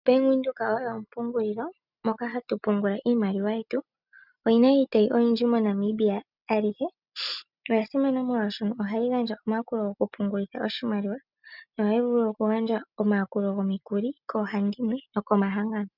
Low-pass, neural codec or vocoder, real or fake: 5.4 kHz; none; real